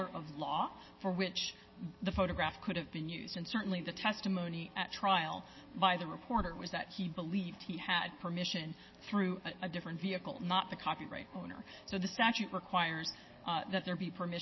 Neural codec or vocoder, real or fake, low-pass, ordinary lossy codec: none; real; 7.2 kHz; MP3, 24 kbps